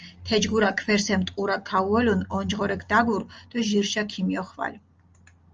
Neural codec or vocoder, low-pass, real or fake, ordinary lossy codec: none; 7.2 kHz; real; Opus, 24 kbps